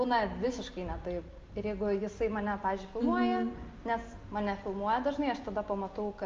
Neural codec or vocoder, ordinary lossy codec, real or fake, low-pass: none; Opus, 24 kbps; real; 7.2 kHz